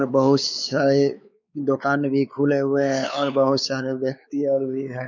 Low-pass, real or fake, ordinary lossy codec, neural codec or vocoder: 7.2 kHz; fake; none; codec, 16 kHz, 4 kbps, X-Codec, WavLM features, trained on Multilingual LibriSpeech